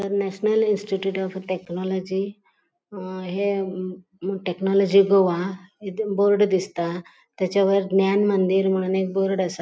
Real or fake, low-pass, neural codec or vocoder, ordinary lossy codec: real; none; none; none